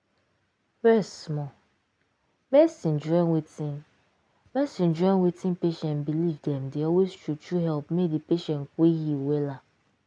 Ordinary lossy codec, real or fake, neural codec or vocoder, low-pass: MP3, 96 kbps; real; none; 9.9 kHz